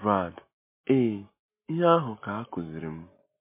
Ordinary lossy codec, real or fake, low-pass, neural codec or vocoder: MP3, 24 kbps; real; 3.6 kHz; none